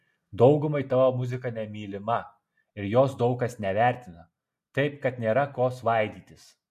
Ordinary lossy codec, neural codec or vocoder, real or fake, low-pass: MP3, 64 kbps; none; real; 14.4 kHz